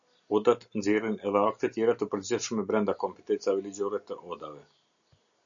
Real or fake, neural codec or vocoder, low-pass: real; none; 7.2 kHz